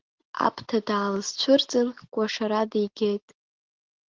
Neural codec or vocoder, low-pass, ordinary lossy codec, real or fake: none; 7.2 kHz; Opus, 16 kbps; real